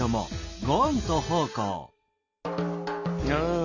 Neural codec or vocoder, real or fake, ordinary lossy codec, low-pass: none; real; none; 7.2 kHz